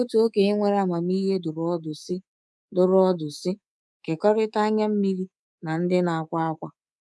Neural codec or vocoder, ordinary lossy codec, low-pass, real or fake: codec, 24 kHz, 3.1 kbps, DualCodec; none; none; fake